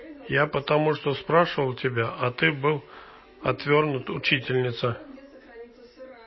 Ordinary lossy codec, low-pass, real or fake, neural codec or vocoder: MP3, 24 kbps; 7.2 kHz; real; none